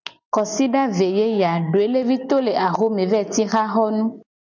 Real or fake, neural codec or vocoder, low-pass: real; none; 7.2 kHz